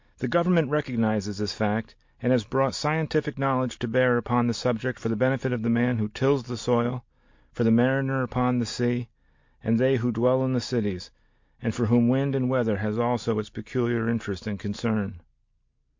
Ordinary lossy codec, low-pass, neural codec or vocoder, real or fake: MP3, 48 kbps; 7.2 kHz; none; real